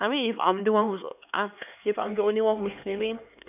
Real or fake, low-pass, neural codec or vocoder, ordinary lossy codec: fake; 3.6 kHz; codec, 16 kHz, 4 kbps, X-Codec, HuBERT features, trained on LibriSpeech; none